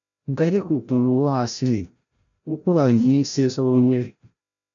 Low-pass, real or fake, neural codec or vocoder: 7.2 kHz; fake; codec, 16 kHz, 0.5 kbps, FreqCodec, larger model